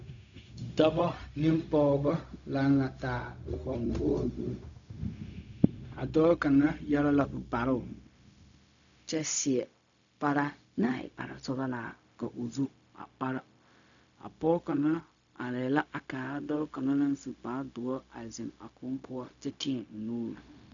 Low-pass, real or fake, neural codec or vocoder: 7.2 kHz; fake; codec, 16 kHz, 0.4 kbps, LongCat-Audio-Codec